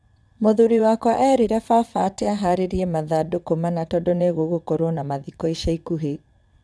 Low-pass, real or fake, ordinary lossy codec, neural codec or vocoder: none; fake; none; vocoder, 22.05 kHz, 80 mel bands, WaveNeXt